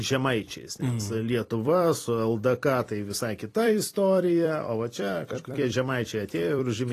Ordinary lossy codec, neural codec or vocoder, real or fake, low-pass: AAC, 48 kbps; vocoder, 44.1 kHz, 128 mel bands every 256 samples, BigVGAN v2; fake; 14.4 kHz